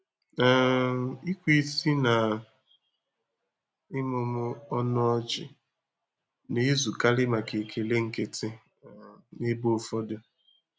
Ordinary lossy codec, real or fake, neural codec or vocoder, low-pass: none; real; none; none